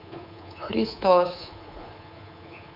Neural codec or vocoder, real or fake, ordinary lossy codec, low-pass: codec, 24 kHz, 3.1 kbps, DualCodec; fake; none; 5.4 kHz